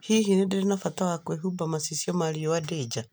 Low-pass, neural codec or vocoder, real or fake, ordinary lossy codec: none; none; real; none